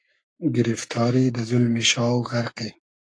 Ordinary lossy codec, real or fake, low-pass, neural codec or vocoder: AAC, 48 kbps; fake; 9.9 kHz; codec, 44.1 kHz, 7.8 kbps, Pupu-Codec